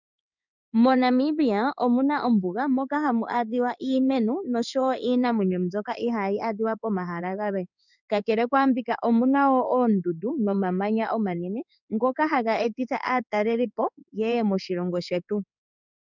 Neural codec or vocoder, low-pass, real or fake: codec, 16 kHz in and 24 kHz out, 1 kbps, XY-Tokenizer; 7.2 kHz; fake